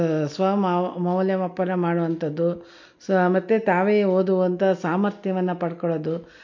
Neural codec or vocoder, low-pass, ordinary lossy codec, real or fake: none; 7.2 kHz; MP3, 48 kbps; real